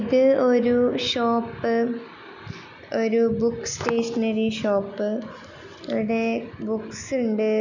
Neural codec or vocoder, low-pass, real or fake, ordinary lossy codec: none; 7.2 kHz; real; none